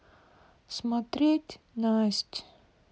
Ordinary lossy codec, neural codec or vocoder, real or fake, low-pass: none; none; real; none